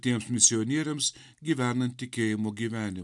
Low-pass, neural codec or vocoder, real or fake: 10.8 kHz; none; real